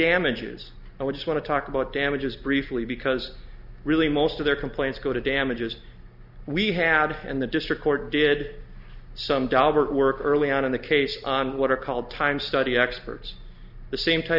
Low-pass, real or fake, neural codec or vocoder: 5.4 kHz; real; none